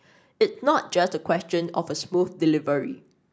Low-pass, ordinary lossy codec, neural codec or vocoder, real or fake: none; none; none; real